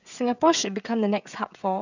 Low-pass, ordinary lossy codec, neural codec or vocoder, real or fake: 7.2 kHz; none; codec, 16 kHz, 16 kbps, FreqCodec, smaller model; fake